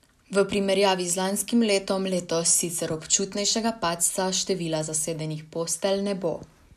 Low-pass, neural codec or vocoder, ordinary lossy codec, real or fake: 14.4 kHz; none; none; real